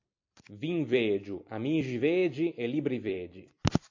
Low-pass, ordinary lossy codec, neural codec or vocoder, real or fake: 7.2 kHz; AAC, 48 kbps; vocoder, 44.1 kHz, 128 mel bands every 256 samples, BigVGAN v2; fake